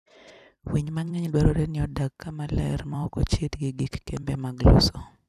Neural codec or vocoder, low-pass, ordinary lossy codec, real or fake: vocoder, 44.1 kHz, 128 mel bands every 256 samples, BigVGAN v2; 14.4 kHz; none; fake